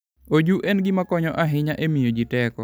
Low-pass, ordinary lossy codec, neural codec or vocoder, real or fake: none; none; none; real